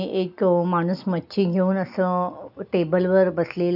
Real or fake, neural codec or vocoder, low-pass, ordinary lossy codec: real; none; 5.4 kHz; none